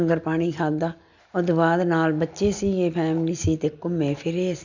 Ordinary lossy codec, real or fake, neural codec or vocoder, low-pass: none; real; none; 7.2 kHz